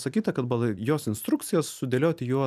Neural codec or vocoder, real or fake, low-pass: none; real; 14.4 kHz